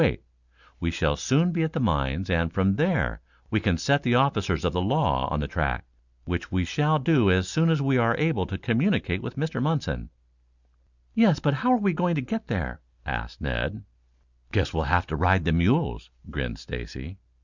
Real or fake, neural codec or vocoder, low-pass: real; none; 7.2 kHz